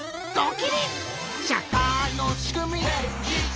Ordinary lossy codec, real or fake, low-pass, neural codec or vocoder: none; real; none; none